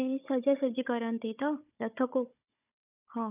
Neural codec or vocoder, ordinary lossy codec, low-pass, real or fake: codec, 16 kHz, 16 kbps, FunCodec, trained on Chinese and English, 50 frames a second; none; 3.6 kHz; fake